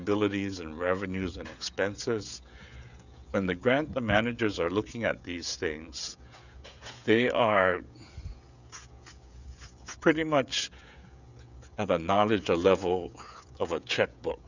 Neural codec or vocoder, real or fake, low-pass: codec, 16 kHz, 16 kbps, FreqCodec, smaller model; fake; 7.2 kHz